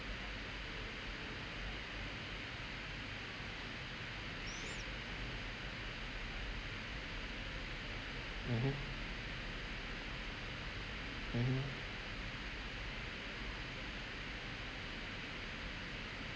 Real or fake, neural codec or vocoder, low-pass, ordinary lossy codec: real; none; none; none